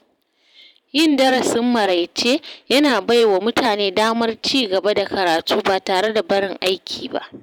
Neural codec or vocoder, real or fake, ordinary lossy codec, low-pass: vocoder, 44.1 kHz, 128 mel bands every 512 samples, BigVGAN v2; fake; none; 19.8 kHz